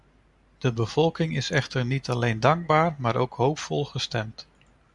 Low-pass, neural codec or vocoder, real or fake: 10.8 kHz; none; real